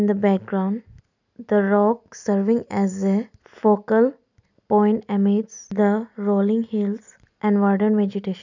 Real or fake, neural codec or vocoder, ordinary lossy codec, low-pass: real; none; none; 7.2 kHz